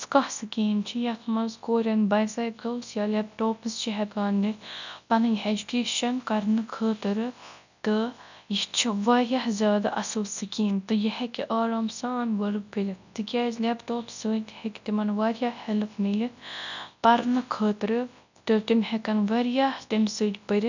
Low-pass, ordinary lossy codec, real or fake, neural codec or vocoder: 7.2 kHz; none; fake; codec, 24 kHz, 0.9 kbps, WavTokenizer, large speech release